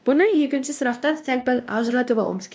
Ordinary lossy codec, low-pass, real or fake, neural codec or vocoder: none; none; fake; codec, 16 kHz, 1 kbps, X-Codec, WavLM features, trained on Multilingual LibriSpeech